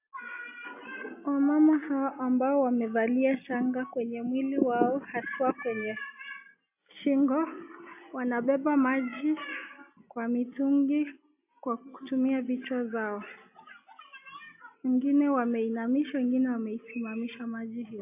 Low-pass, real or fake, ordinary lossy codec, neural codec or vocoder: 3.6 kHz; real; AAC, 32 kbps; none